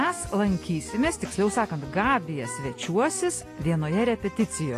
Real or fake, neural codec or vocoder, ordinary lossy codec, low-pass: real; none; AAC, 48 kbps; 14.4 kHz